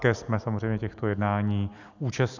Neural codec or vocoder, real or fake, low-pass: none; real; 7.2 kHz